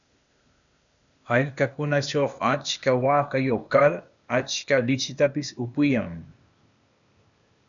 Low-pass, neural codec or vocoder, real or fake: 7.2 kHz; codec, 16 kHz, 0.8 kbps, ZipCodec; fake